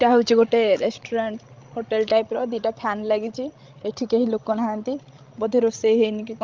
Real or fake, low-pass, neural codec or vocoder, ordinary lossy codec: fake; 7.2 kHz; codec, 16 kHz, 16 kbps, FreqCodec, larger model; Opus, 32 kbps